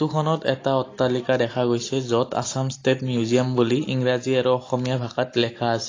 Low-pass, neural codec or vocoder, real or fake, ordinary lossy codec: 7.2 kHz; none; real; AAC, 32 kbps